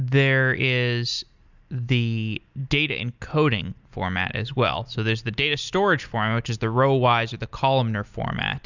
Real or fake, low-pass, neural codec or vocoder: real; 7.2 kHz; none